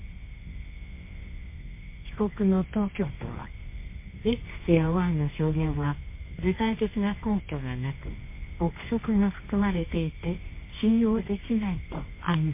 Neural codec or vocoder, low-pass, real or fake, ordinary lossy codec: codec, 24 kHz, 0.9 kbps, WavTokenizer, medium music audio release; 3.6 kHz; fake; MP3, 24 kbps